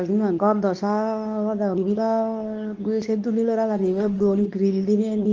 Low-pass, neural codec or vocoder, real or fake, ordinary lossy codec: 7.2 kHz; codec, 24 kHz, 0.9 kbps, WavTokenizer, medium speech release version 2; fake; Opus, 24 kbps